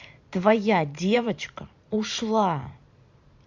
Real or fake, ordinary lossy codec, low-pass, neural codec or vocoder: fake; Opus, 64 kbps; 7.2 kHz; vocoder, 44.1 kHz, 128 mel bands every 512 samples, BigVGAN v2